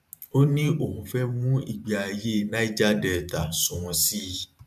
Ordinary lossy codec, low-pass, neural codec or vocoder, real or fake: none; 14.4 kHz; vocoder, 44.1 kHz, 128 mel bands every 512 samples, BigVGAN v2; fake